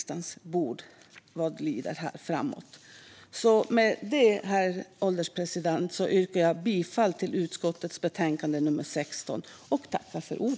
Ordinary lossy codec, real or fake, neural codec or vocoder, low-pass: none; real; none; none